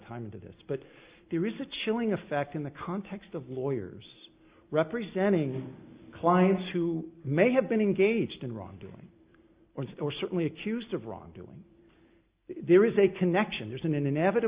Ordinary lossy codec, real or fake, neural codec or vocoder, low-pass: Opus, 64 kbps; real; none; 3.6 kHz